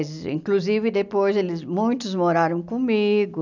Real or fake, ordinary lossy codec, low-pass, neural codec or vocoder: real; none; 7.2 kHz; none